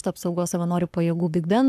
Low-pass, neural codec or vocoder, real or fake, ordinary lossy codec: 14.4 kHz; codec, 44.1 kHz, 7.8 kbps, Pupu-Codec; fake; AAC, 96 kbps